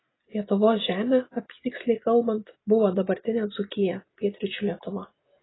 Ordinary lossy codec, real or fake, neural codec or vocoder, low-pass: AAC, 16 kbps; real; none; 7.2 kHz